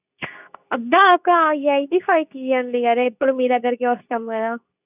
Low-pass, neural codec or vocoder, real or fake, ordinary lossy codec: 3.6 kHz; codec, 24 kHz, 0.9 kbps, WavTokenizer, medium speech release version 2; fake; none